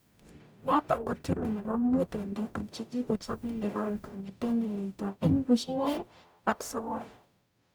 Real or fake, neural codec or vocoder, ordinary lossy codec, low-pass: fake; codec, 44.1 kHz, 0.9 kbps, DAC; none; none